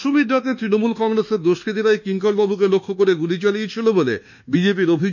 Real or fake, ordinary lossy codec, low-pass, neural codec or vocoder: fake; none; 7.2 kHz; codec, 24 kHz, 1.2 kbps, DualCodec